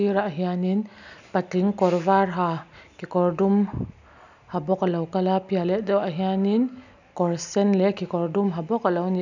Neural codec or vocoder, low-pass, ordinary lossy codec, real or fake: none; 7.2 kHz; none; real